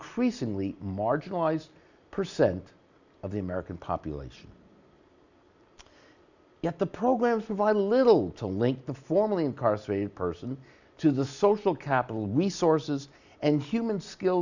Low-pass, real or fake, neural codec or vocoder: 7.2 kHz; real; none